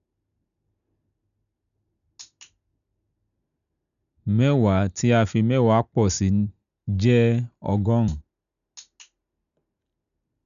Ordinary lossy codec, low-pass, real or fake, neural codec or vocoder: none; 7.2 kHz; real; none